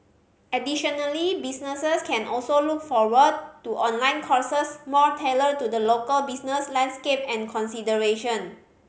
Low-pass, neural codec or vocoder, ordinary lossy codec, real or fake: none; none; none; real